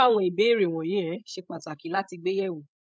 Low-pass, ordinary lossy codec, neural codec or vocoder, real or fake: none; none; codec, 16 kHz, 16 kbps, FreqCodec, larger model; fake